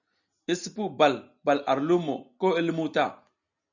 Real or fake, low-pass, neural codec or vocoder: real; 7.2 kHz; none